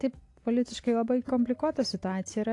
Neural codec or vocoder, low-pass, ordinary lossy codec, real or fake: none; 10.8 kHz; AAC, 48 kbps; real